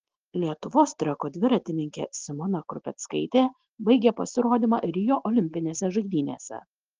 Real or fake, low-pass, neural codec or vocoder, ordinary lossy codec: real; 7.2 kHz; none; Opus, 16 kbps